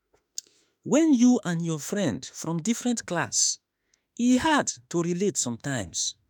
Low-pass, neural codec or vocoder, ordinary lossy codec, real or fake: 19.8 kHz; autoencoder, 48 kHz, 32 numbers a frame, DAC-VAE, trained on Japanese speech; none; fake